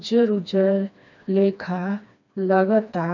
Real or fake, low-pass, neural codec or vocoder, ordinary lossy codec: fake; 7.2 kHz; codec, 16 kHz, 2 kbps, FreqCodec, smaller model; none